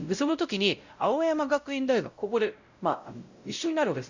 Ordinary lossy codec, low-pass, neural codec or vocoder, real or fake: Opus, 64 kbps; 7.2 kHz; codec, 16 kHz, 0.5 kbps, X-Codec, WavLM features, trained on Multilingual LibriSpeech; fake